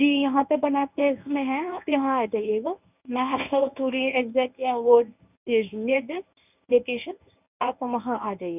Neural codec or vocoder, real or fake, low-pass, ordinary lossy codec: codec, 24 kHz, 0.9 kbps, WavTokenizer, medium speech release version 1; fake; 3.6 kHz; none